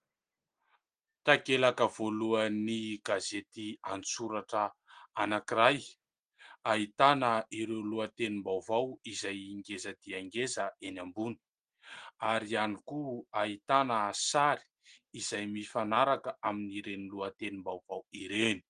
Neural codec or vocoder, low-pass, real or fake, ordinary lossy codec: none; 9.9 kHz; real; Opus, 24 kbps